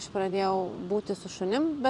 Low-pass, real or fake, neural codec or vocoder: 10.8 kHz; fake; vocoder, 24 kHz, 100 mel bands, Vocos